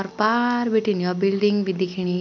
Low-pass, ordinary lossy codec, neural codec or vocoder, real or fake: 7.2 kHz; none; none; real